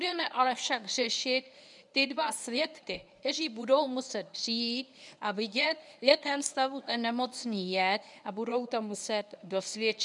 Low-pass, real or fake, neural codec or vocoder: 10.8 kHz; fake; codec, 24 kHz, 0.9 kbps, WavTokenizer, medium speech release version 1